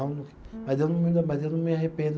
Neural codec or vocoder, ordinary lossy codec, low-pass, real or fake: none; none; none; real